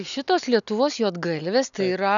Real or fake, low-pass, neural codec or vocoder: real; 7.2 kHz; none